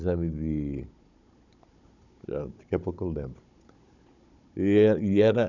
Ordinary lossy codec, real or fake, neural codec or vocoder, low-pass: none; fake; codec, 16 kHz, 16 kbps, FunCodec, trained on Chinese and English, 50 frames a second; 7.2 kHz